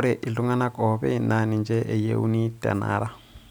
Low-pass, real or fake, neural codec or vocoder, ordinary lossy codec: none; real; none; none